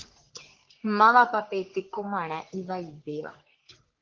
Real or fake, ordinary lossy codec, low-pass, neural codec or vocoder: fake; Opus, 16 kbps; 7.2 kHz; codec, 44.1 kHz, 3.4 kbps, Pupu-Codec